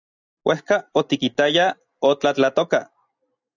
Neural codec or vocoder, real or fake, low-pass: none; real; 7.2 kHz